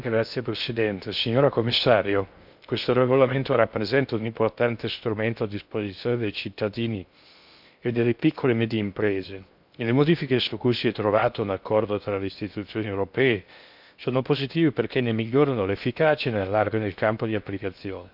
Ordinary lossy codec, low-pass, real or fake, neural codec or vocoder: none; 5.4 kHz; fake; codec, 16 kHz in and 24 kHz out, 0.6 kbps, FocalCodec, streaming, 2048 codes